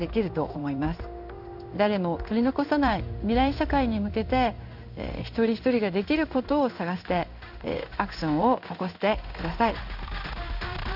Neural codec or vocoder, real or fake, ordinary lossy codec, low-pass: codec, 16 kHz in and 24 kHz out, 1 kbps, XY-Tokenizer; fake; none; 5.4 kHz